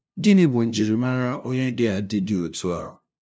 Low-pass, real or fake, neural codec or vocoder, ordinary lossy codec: none; fake; codec, 16 kHz, 0.5 kbps, FunCodec, trained on LibriTTS, 25 frames a second; none